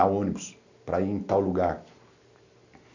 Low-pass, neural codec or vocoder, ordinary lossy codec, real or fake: 7.2 kHz; none; none; real